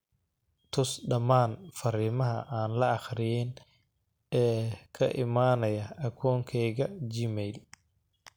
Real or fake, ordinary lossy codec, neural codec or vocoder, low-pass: real; none; none; none